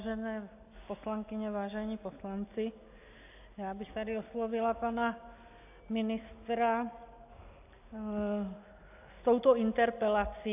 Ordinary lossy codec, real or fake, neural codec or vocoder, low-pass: AAC, 32 kbps; real; none; 3.6 kHz